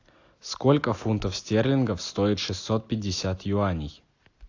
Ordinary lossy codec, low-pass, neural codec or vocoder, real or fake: AAC, 48 kbps; 7.2 kHz; none; real